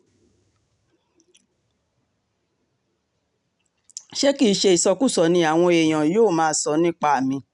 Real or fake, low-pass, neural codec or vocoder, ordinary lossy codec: real; 10.8 kHz; none; none